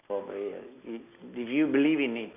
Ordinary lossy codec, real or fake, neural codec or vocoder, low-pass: none; real; none; 3.6 kHz